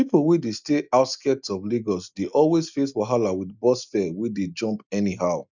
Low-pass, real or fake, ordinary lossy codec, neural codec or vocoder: 7.2 kHz; real; none; none